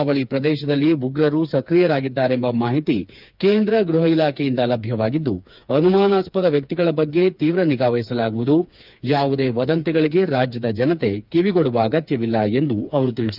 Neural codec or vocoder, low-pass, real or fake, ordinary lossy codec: codec, 16 kHz, 4 kbps, FreqCodec, smaller model; 5.4 kHz; fake; none